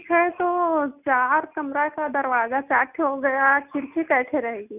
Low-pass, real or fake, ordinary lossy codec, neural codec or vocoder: 3.6 kHz; real; none; none